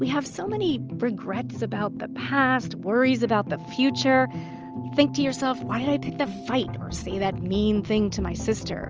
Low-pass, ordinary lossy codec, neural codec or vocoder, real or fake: 7.2 kHz; Opus, 24 kbps; none; real